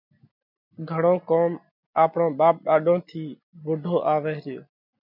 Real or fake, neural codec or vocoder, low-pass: real; none; 5.4 kHz